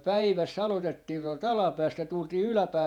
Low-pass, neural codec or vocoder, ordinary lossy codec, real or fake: 19.8 kHz; vocoder, 48 kHz, 128 mel bands, Vocos; none; fake